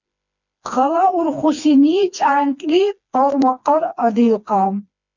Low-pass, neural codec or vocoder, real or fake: 7.2 kHz; codec, 16 kHz, 2 kbps, FreqCodec, smaller model; fake